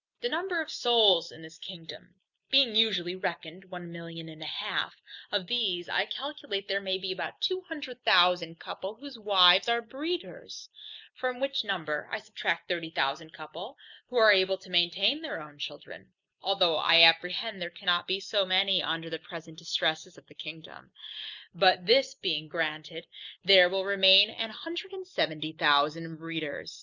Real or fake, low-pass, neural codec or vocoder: real; 7.2 kHz; none